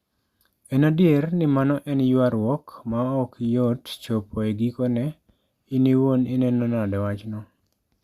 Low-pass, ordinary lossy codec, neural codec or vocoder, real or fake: 14.4 kHz; Opus, 64 kbps; none; real